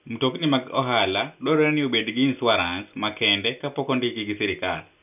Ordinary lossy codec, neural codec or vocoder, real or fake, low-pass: none; none; real; 3.6 kHz